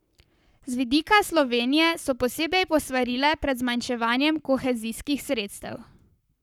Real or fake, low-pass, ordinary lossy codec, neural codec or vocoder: fake; 19.8 kHz; none; codec, 44.1 kHz, 7.8 kbps, Pupu-Codec